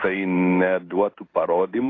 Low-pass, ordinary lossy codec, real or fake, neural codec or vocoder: 7.2 kHz; AAC, 32 kbps; fake; codec, 16 kHz in and 24 kHz out, 1 kbps, XY-Tokenizer